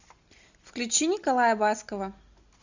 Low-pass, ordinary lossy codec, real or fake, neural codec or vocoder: 7.2 kHz; Opus, 64 kbps; real; none